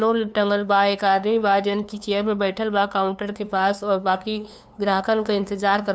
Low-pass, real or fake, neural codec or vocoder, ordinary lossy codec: none; fake; codec, 16 kHz, 2 kbps, FunCodec, trained on LibriTTS, 25 frames a second; none